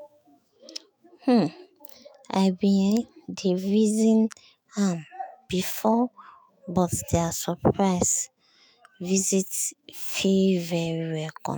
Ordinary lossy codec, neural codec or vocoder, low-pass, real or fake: none; autoencoder, 48 kHz, 128 numbers a frame, DAC-VAE, trained on Japanese speech; none; fake